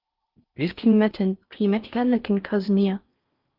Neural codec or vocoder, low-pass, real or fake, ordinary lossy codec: codec, 16 kHz in and 24 kHz out, 0.6 kbps, FocalCodec, streaming, 4096 codes; 5.4 kHz; fake; Opus, 24 kbps